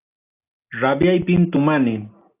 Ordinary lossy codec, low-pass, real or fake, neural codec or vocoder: AAC, 24 kbps; 3.6 kHz; real; none